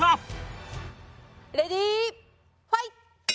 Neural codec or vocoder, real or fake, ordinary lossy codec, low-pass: none; real; none; none